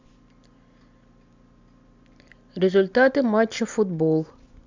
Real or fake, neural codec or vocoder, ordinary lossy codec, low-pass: real; none; MP3, 64 kbps; 7.2 kHz